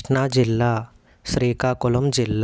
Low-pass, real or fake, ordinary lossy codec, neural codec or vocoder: none; real; none; none